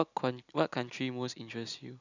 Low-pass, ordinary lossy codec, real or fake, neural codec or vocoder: 7.2 kHz; none; real; none